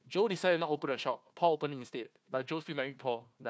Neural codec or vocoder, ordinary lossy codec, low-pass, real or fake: codec, 16 kHz, 1 kbps, FunCodec, trained on Chinese and English, 50 frames a second; none; none; fake